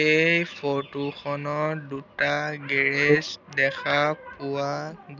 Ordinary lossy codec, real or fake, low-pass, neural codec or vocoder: none; real; 7.2 kHz; none